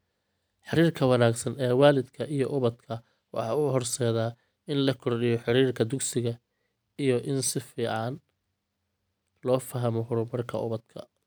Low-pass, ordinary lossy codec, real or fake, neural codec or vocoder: none; none; real; none